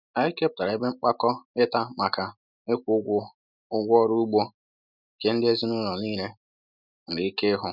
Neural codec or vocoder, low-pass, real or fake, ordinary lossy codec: none; 5.4 kHz; real; none